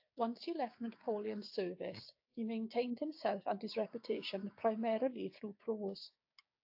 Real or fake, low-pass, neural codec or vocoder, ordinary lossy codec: fake; 5.4 kHz; codec, 16 kHz, 6 kbps, DAC; AAC, 48 kbps